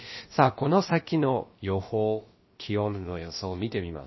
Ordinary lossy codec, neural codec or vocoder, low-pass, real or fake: MP3, 24 kbps; codec, 16 kHz, about 1 kbps, DyCAST, with the encoder's durations; 7.2 kHz; fake